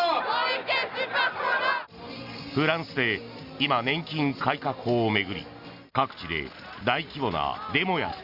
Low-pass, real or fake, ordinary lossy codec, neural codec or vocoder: 5.4 kHz; real; Opus, 64 kbps; none